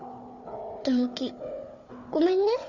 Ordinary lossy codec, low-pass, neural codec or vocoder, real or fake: none; 7.2 kHz; codec, 16 kHz, 4 kbps, FunCodec, trained on Chinese and English, 50 frames a second; fake